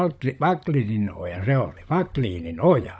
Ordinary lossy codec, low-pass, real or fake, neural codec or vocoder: none; none; fake; codec, 16 kHz, 16 kbps, FunCodec, trained on LibriTTS, 50 frames a second